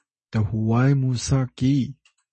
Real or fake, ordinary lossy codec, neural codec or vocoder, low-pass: real; MP3, 32 kbps; none; 10.8 kHz